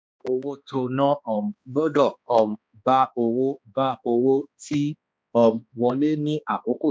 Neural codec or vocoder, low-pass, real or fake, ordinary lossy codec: codec, 16 kHz, 2 kbps, X-Codec, HuBERT features, trained on balanced general audio; none; fake; none